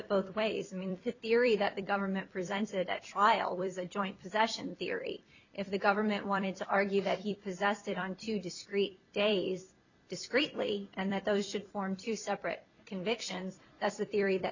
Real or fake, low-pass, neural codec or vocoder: fake; 7.2 kHz; vocoder, 44.1 kHz, 128 mel bands every 256 samples, BigVGAN v2